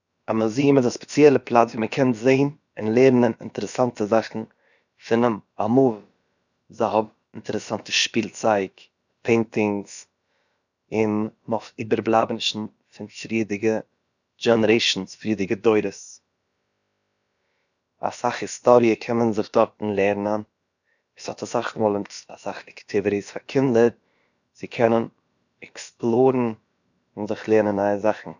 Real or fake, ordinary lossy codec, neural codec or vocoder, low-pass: fake; none; codec, 16 kHz, about 1 kbps, DyCAST, with the encoder's durations; 7.2 kHz